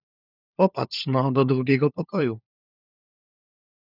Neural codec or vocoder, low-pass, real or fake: codec, 16 kHz, 4 kbps, FunCodec, trained on LibriTTS, 50 frames a second; 5.4 kHz; fake